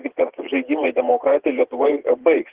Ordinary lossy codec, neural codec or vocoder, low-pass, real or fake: Opus, 16 kbps; vocoder, 44.1 kHz, 80 mel bands, Vocos; 3.6 kHz; fake